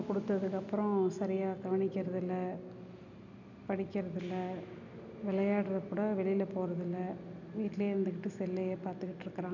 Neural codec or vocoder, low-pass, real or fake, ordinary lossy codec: none; 7.2 kHz; real; none